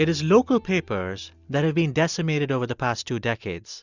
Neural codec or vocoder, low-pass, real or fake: none; 7.2 kHz; real